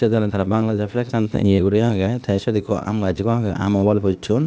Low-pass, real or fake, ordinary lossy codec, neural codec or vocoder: none; fake; none; codec, 16 kHz, 0.8 kbps, ZipCodec